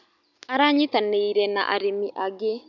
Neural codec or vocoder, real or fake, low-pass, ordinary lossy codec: none; real; 7.2 kHz; none